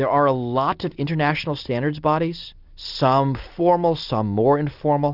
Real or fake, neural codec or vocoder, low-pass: real; none; 5.4 kHz